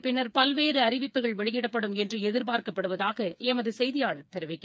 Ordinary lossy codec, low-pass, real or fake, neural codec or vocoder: none; none; fake; codec, 16 kHz, 4 kbps, FreqCodec, smaller model